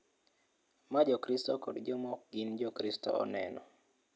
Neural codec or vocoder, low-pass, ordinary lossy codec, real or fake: none; none; none; real